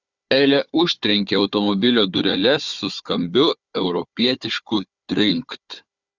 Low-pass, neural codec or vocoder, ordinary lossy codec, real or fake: 7.2 kHz; codec, 16 kHz, 4 kbps, FunCodec, trained on Chinese and English, 50 frames a second; Opus, 64 kbps; fake